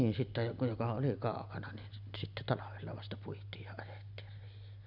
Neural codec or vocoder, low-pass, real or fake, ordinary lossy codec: none; 5.4 kHz; real; none